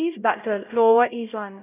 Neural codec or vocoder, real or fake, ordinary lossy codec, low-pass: codec, 16 kHz, 0.5 kbps, X-Codec, HuBERT features, trained on LibriSpeech; fake; none; 3.6 kHz